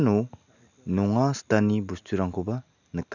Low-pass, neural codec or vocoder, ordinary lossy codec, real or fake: 7.2 kHz; none; none; real